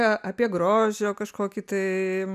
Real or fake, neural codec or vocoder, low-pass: real; none; 14.4 kHz